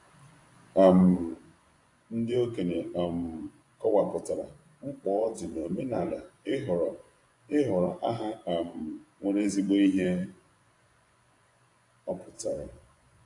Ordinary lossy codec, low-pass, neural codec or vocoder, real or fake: AAC, 48 kbps; 10.8 kHz; vocoder, 24 kHz, 100 mel bands, Vocos; fake